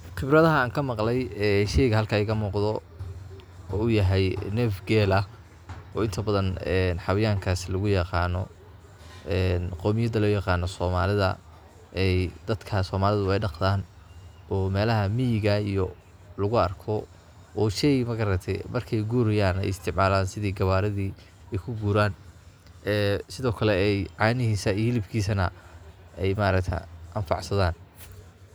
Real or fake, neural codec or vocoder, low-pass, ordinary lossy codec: real; none; none; none